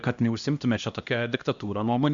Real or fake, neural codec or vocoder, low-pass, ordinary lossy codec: fake; codec, 16 kHz, 1 kbps, X-Codec, HuBERT features, trained on LibriSpeech; 7.2 kHz; Opus, 64 kbps